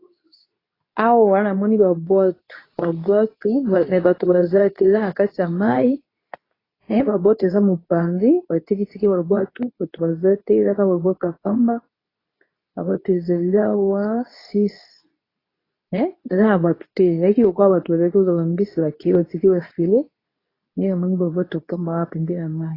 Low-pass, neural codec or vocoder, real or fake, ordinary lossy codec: 5.4 kHz; codec, 24 kHz, 0.9 kbps, WavTokenizer, medium speech release version 2; fake; AAC, 24 kbps